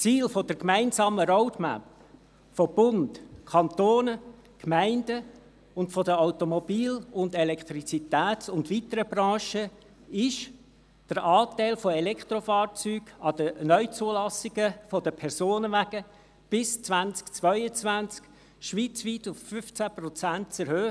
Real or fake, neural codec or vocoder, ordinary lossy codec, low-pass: real; none; none; none